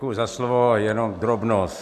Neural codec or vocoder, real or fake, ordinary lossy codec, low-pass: none; real; AAC, 96 kbps; 14.4 kHz